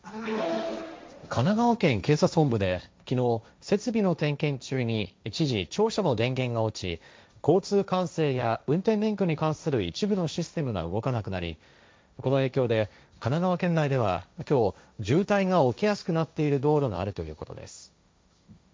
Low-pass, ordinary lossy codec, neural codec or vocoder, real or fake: none; none; codec, 16 kHz, 1.1 kbps, Voila-Tokenizer; fake